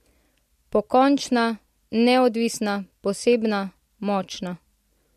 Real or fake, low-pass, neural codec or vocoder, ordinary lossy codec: real; 14.4 kHz; none; MP3, 64 kbps